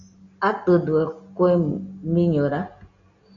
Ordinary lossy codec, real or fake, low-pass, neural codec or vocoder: AAC, 64 kbps; real; 7.2 kHz; none